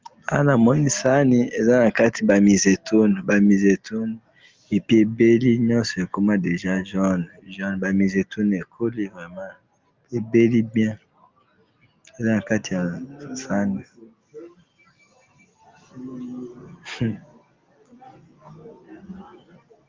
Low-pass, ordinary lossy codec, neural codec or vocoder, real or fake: 7.2 kHz; Opus, 32 kbps; none; real